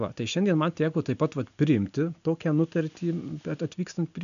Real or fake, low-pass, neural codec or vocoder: real; 7.2 kHz; none